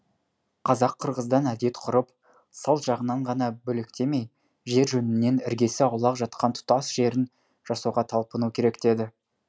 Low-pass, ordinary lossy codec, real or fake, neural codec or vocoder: none; none; real; none